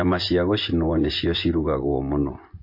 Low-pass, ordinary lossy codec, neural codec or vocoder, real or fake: 5.4 kHz; MP3, 32 kbps; vocoder, 24 kHz, 100 mel bands, Vocos; fake